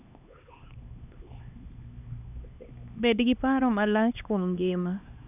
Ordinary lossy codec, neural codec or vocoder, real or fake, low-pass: none; codec, 16 kHz, 2 kbps, X-Codec, HuBERT features, trained on LibriSpeech; fake; 3.6 kHz